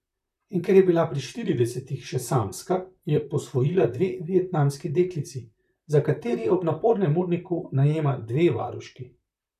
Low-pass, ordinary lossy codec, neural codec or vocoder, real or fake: 14.4 kHz; none; vocoder, 44.1 kHz, 128 mel bands, Pupu-Vocoder; fake